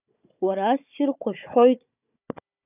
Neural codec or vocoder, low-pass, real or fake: codec, 16 kHz, 4 kbps, FunCodec, trained on Chinese and English, 50 frames a second; 3.6 kHz; fake